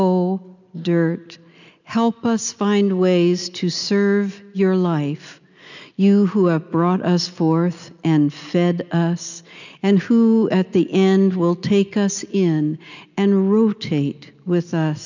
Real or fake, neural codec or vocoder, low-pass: real; none; 7.2 kHz